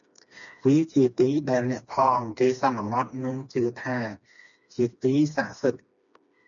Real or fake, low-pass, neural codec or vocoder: fake; 7.2 kHz; codec, 16 kHz, 2 kbps, FreqCodec, smaller model